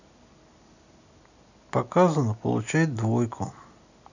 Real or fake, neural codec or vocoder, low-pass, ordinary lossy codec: real; none; 7.2 kHz; none